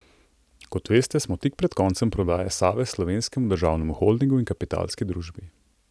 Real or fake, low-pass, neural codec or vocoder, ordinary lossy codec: real; none; none; none